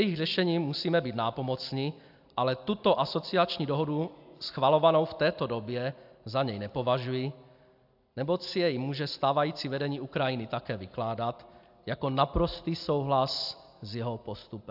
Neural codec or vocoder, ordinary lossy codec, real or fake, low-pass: none; AAC, 48 kbps; real; 5.4 kHz